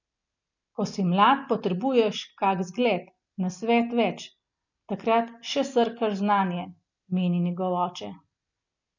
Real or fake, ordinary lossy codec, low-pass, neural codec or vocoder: real; none; 7.2 kHz; none